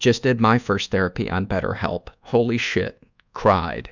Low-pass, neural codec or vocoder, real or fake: 7.2 kHz; codec, 24 kHz, 1.2 kbps, DualCodec; fake